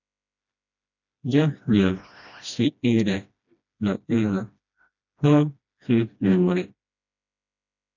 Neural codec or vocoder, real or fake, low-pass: codec, 16 kHz, 1 kbps, FreqCodec, smaller model; fake; 7.2 kHz